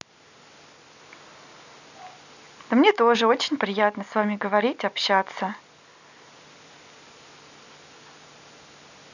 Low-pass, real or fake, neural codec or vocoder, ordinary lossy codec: 7.2 kHz; real; none; none